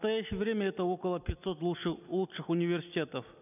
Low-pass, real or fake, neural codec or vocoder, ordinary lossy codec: 3.6 kHz; real; none; none